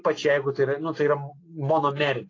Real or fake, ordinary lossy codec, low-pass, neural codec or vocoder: real; AAC, 32 kbps; 7.2 kHz; none